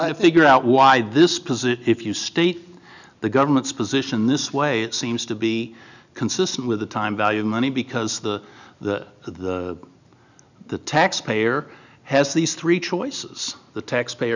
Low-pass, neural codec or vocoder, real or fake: 7.2 kHz; none; real